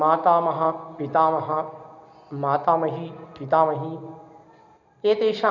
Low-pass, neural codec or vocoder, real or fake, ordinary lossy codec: 7.2 kHz; none; real; none